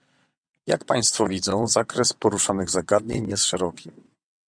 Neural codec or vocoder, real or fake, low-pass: vocoder, 22.05 kHz, 80 mel bands, WaveNeXt; fake; 9.9 kHz